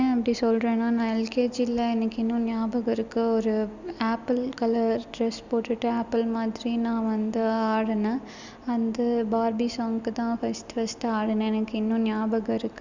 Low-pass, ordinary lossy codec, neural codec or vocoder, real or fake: 7.2 kHz; Opus, 64 kbps; none; real